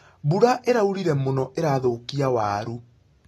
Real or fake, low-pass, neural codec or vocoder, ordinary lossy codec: real; 19.8 kHz; none; AAC, 32 kbps